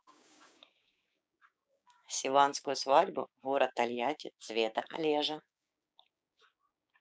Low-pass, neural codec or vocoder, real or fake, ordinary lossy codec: none; codec, 16 kHz, 6 kbps, DAC; fake; none